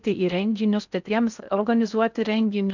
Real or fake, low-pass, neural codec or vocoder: fake; 7.2 kHz; codec, 16 kHz in and 24 kHz out, 0.6 kbps, FocalCodec, streaming, 4096 codes